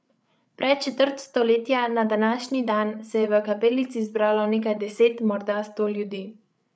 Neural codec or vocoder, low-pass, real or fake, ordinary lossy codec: codec, 16 kHz, 8 kbps, FreqCodec, larger model; none; fake; none